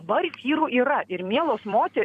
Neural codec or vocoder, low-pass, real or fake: vocoder, 44.1 kHz, 128 mel bands every 256 samples, BigVGAN v2; 14.4 kHz; fake